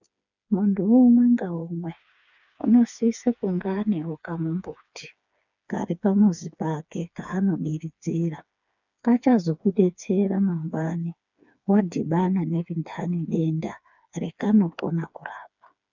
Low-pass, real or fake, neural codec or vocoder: 7.2 kHz; fake; codec, 16 kHz, 4 kbps, FreqCodec, smaller model